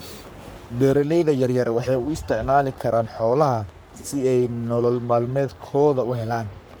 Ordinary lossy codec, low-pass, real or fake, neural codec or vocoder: none; none; fake; codec, 44.1 kHz, 3.4 kbps, Pupu-Codec